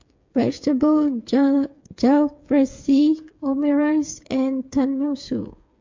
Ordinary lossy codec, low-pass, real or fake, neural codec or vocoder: MP3, 48 kbps; 7.2 kHz; fake; codec, 16 kHz, 8 kbps, FreqCodec, smaller model